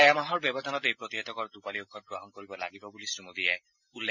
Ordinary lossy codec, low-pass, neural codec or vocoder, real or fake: none; 7.2 kHz; none; real